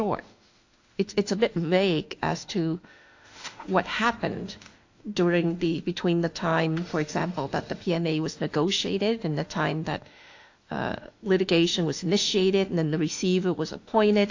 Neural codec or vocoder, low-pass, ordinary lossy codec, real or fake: autoencoder, 48 kHz, 32 numbers a frame, DAC-VAE, trained on Japanese speech; 7.2 kHz; AAC, 48 kbps; fake